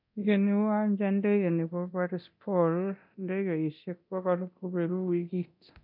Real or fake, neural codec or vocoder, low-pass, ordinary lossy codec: fake; codec, 24 kHz, 0.9 kbps, DualCodec; 5.4 kHz; MP3, 48 kbps